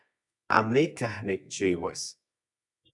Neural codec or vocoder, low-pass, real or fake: codec, 24 kHz, 0.9 kbps, WavTokenizer, medium music audio release; 10.8 kHz; fake